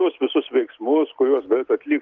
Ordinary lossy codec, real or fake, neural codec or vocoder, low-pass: Opus, 32 kbps; real; none; 7.2 kHz